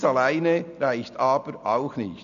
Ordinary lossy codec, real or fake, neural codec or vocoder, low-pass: none; real; none; 7.2 kHz